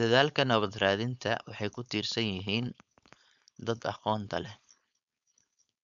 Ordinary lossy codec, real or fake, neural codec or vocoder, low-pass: none; fake; codec, 16 kHz, 4.8 kbps, FACodec; 7.2 kHz